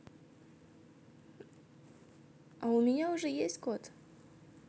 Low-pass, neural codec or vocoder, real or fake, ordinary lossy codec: none; none; real; none